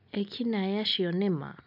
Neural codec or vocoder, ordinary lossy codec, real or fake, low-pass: none; none; real; 5.4 kHz